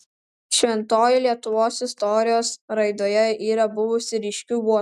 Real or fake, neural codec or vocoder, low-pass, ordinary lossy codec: real; none; 14.4 kHz; MP3, 96 kbps